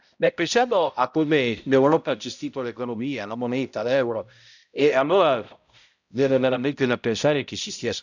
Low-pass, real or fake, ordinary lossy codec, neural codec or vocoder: 7.2 kHz; fake; none; codec, 16 kHz, 0.5 kbps, X-Codec, HuBERT features, trained on balanced general audio